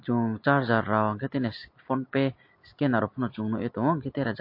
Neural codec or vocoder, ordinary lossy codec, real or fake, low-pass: none; MP3, 32 kbps; real; 5.4 kHz